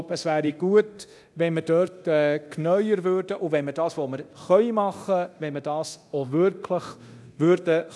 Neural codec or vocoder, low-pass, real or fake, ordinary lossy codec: codec, 24 kHz, 0.9 kbps, DualCodec; none; fake; none